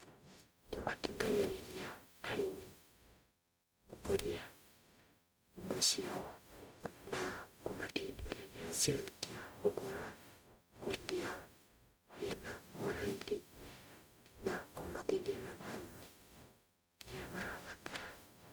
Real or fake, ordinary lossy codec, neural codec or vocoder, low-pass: fake; none; codec, 44.1 kHz, 0.9 kbps, DAC; none